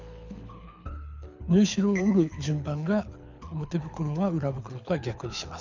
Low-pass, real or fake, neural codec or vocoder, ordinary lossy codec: 7.2 kHz; fake; codec, 24 kHz, 6 kbps, HILCodec; none